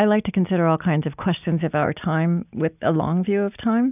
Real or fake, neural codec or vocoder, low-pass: real; none; 3.6 kHz